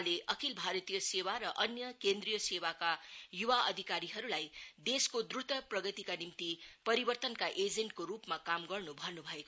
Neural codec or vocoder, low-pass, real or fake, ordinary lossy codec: none; none; real; none